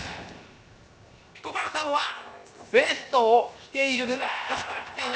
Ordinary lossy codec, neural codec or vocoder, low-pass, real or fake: none; codec, 16 kHz, 0.7 kbps, FocalCodec; none; fake